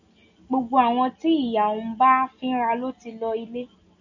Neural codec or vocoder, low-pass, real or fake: none; 7.2 kHz; real